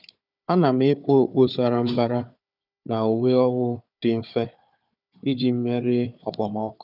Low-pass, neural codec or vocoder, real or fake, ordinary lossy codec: 5.4 kHz; codec, 16 kHz, 4 kbps, FunCodec, trained on Chinese and English, 50 frames a second; fake; none